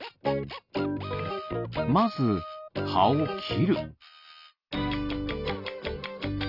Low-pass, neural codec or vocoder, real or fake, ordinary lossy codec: 5.4 kHz; none; real; none